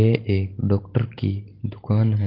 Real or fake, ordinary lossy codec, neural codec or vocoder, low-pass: real; Opus, 16 kbps; none; 5.4 kHz